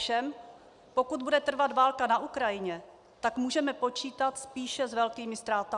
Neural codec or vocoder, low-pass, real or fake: none; 10.8 kHz; real